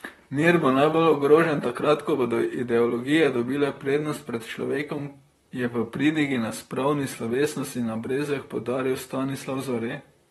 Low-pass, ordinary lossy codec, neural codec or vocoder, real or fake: 19.8 kHz; AAC, 32 kbps; vocoder, 44.1 kHz, 128 mel bands, Pupu-Vocoder; fake